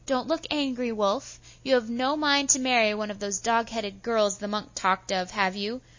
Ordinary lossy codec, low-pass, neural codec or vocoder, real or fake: MP3, 32 kbps; 7.2 kHz; none; real